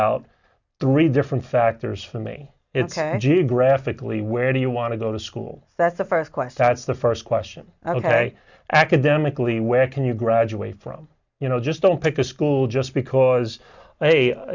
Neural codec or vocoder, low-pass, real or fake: none; 7.2 kHz; real